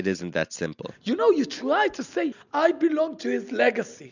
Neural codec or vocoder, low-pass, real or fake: none; 7.2 kHz; real